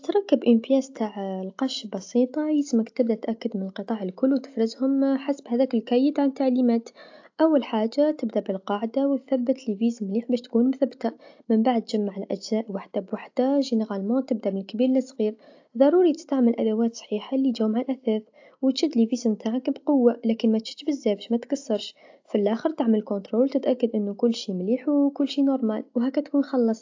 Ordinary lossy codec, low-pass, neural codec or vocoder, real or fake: AAC, 48 kbps; 7.2 kHz; none; real